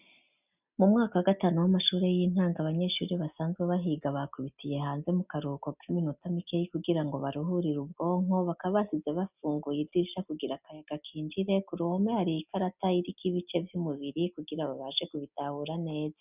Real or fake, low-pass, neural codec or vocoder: real; 3.6 kHz; none